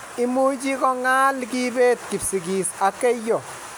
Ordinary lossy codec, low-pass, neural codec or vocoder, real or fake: none; none; none; real